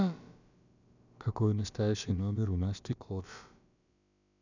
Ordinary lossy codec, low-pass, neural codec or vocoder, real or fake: none; 7.2 kHz; codec, 16 kHz, about 1 kbps, DyCAST, with the encoder's durations; fake